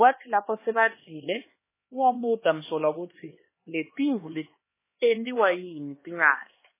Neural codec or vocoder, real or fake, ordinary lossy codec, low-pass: codec, 16 kHz, 2 kbps, X-Codec, HuBERT features, trained on LibriSpeech; fake; MP3, 16 kbps; 3.6 kHz